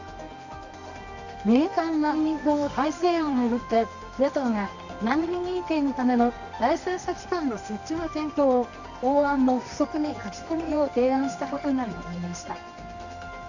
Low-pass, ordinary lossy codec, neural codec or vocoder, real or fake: 7.2 kHz; none; codec, 24 kHz, 0.9 kbps, WavTokenizer, medium music audio release; fake